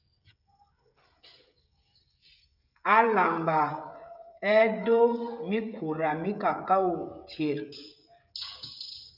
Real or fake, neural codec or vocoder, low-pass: fake; codec, 16 kHz, 16 kbps, FreqCodec, smaller model; 5.4 kHz